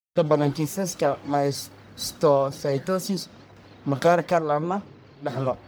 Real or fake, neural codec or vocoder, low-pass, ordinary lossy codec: fake; codec, 44.1 kHz, 1.7 kbps, Pupu-Codec; none; none